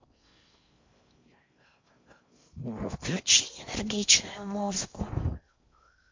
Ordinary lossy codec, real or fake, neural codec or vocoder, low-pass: MP3, 48 kbps; fake; codec, 16 kHz in and 24 kHz out, 0.6 kbps, FocalCodec, streaming, 4096 codes; 7.2 kHz